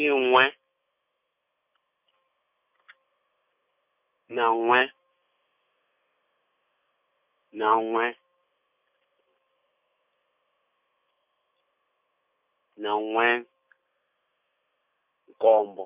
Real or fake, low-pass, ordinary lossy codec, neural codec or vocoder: real; 3.6 kHz; none; none